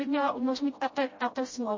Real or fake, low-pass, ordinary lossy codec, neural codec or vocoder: fake; 7.2 kHz; MP3, 32 kbps; codec, 16 kHz, 0.5 kbps, FreqCodec, smaller model